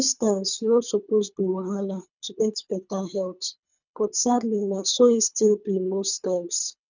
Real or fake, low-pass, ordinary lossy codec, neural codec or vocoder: fake; 7.2 kHz; none; codec, 24 kHz, 3 kbps, HILCodec